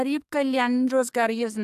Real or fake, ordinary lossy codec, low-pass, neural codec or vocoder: fake; MP3, 96 kbps; 14.4 kHz; codec, 44.1 kHz, 2.6 kbps, SNAC